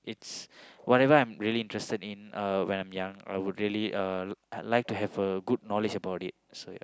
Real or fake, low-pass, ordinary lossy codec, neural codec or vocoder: real; none; none; none